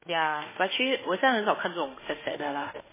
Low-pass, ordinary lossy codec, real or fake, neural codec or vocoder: 3.6 kHz; MP3, 16 kbps; fake; autoencoder, 48 kHz, 32 numbers a frame, DAC-VAE, trained on Japanese speech